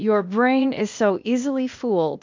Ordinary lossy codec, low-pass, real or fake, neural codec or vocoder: MP3, 48 kbps; 7.2 kHz; fake; codec, 16 kHz, 0.8 kbps, ZipCodec